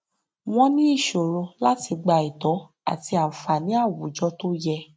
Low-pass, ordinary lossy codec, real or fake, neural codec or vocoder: none; none; real; none